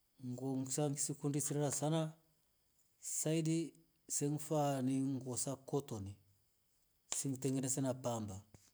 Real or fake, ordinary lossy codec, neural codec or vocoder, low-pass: real; none; none; none